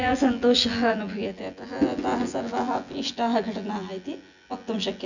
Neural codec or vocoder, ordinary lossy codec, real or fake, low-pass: vocoder, 24 kHz, 100 mel bands, Vocos; none; fake; 7.2 kHz